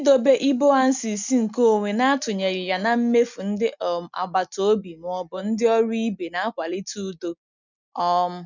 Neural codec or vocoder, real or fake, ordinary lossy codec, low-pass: none; real; none; 7.2 kHz